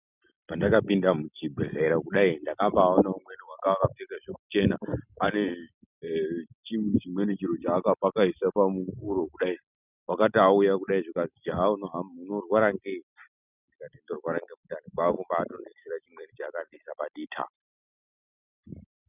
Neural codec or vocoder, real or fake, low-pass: none; real; 3.6 kHz